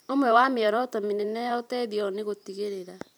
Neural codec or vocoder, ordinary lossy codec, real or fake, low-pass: vocoder, 44.1 kHz, 128 mel bands every 512 samples, BigVGAN v2; none; fake; none